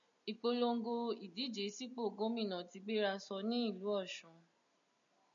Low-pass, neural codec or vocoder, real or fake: 7.2 kHz; none; real